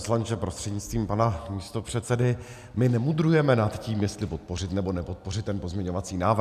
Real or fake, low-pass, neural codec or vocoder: real; 14.4 kHz; none